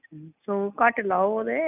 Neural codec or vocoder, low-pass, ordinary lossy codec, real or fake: none; 3.6 kHz; none; real